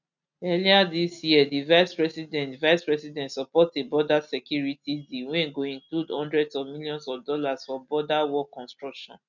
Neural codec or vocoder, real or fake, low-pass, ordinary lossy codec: none; real; 7.2 kHz; none